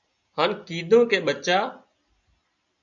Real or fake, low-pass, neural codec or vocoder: real; 7.2 kHz; none